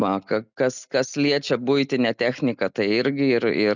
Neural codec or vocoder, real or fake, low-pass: none; real; 7.2 kHz